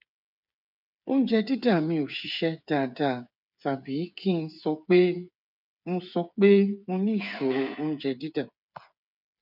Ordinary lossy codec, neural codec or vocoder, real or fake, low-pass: none; codec, 16 kHz, 8 kbps, FreqCodec, smaller model; fake; 5.4 kHz